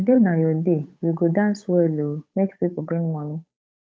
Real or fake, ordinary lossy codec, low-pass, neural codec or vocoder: fake; none; none; codec, 16 kHz, 8 kbps, FunCodec, trained on Chinese and English, 25 frames a second